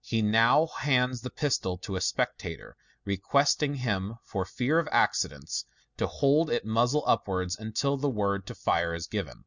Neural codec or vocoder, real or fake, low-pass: none; real; 7.2 kHz